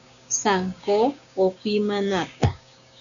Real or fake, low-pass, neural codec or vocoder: fake; 7.2 kHz; codec, 16 kHz, 6 kbps, DAC